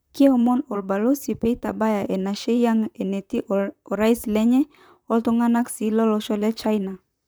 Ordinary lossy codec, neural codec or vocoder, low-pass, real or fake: none; vocoder, 44.1 kHz, 128 mel bands, Pupu-Vocoder; none; fake